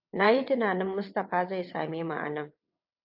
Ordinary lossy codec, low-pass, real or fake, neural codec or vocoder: AAC, 48 kbps; 5.4 kHz; fake; vocoder, 22.05 kHz, 80 mel bands, WaveNeXt